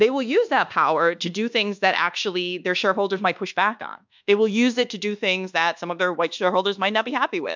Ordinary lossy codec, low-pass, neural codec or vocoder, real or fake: MP3, 64 kbps; 7.2 kHz; codec, 24 kHz, 1.2 kbps, DualCodec; fake